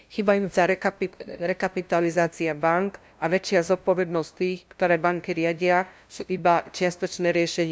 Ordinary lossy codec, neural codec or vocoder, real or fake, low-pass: none; codec, 16 kHz, 0.5 kbps, FunCodec, trained on LibriTTS, 25 frames a second; fake; none